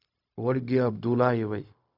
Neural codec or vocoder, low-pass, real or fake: codec, 16 kHz, 0.4 kbps, LongCat-Audio-Codec; 5.4 kHz; fake